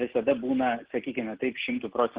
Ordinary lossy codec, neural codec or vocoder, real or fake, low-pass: Opus, 16 kbps; none; real; 3.6 kHz